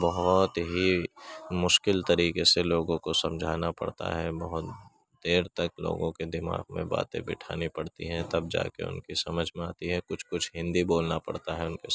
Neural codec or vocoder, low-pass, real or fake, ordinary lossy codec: none; none; real; none